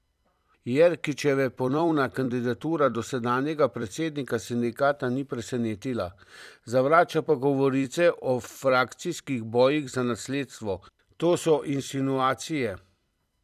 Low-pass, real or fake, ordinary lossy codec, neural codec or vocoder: 14.4 kHz; fake; none; vocoder, 44.1 kHz, 128 mel bands every 256 samples, BigVGAN v2